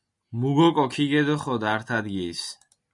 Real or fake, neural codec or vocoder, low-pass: real; none; 10.8 kHz